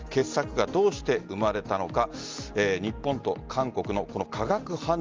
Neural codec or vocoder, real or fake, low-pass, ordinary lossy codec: none; real; 7.2 kHz; Opus, 32 kbps